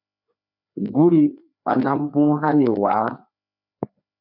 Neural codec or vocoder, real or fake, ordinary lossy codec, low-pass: codec, 16 kHz, 2 kbps, FreqCodec, larger model; fake; MP3, 48 kbps; 5.4 kHz